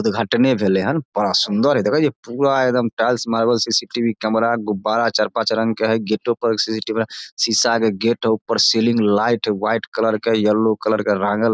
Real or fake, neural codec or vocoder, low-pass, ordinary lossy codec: real; none; none; none